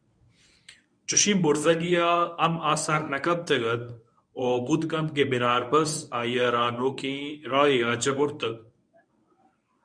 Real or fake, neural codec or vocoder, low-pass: fake; codec, 24 kHz, 0.9 kbps, WavTokenizer, medium speech release version 1; 9.9 kHz